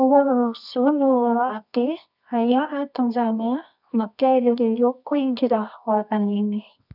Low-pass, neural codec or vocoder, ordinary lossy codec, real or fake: 5.4 kHz; codec, 24 kHz, 0.9 kbps, WavTokenizer, medium music audio release; none; fake